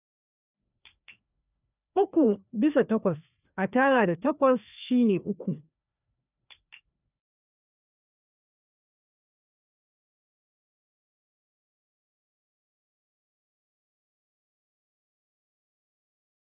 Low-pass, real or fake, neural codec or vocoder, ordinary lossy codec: 3.6 kHz; fake; codec, 16 kHz, 2 kbps, FreqCodec, larger model; none